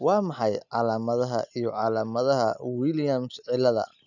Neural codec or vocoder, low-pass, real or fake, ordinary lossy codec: none; 7.2 kHz; real; none